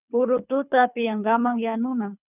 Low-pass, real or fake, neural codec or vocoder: 3.6 kHz; fake; codec, 24 kHz, 3 kbps, HILCodec